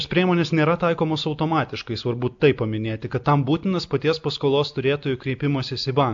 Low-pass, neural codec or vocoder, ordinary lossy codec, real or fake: 7.2 kHz; none; AAC, 64 kbps; real